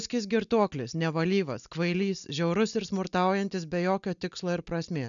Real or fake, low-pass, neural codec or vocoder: real; 7.2 kHz; none